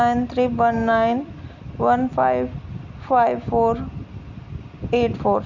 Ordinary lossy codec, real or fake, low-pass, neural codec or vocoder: none; real; 7.2 kHz; none